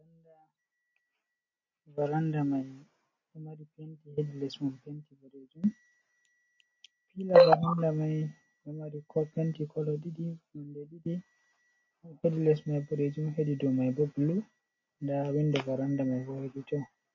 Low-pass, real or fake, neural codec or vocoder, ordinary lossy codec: 7.2 kHz; real; none; MP3, 32 kbps